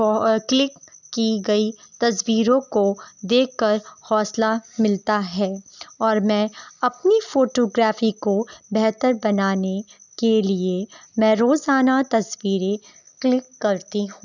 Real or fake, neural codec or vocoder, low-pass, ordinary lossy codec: real; none; 7.2 kHz; none